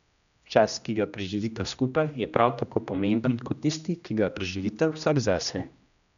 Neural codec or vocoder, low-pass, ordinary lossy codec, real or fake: codec, 16 kHz, 1 kbps, X-Codec, HuBERT features, trained on general audio; 7.2 kHz; none; fake